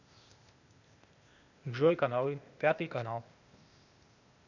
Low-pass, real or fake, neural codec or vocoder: 7.2 kHz; fake; codec, 16 kHz, 0.8 kbps, ZipCodec